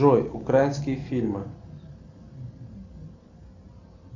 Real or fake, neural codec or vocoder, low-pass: real; none; 7.2 kHz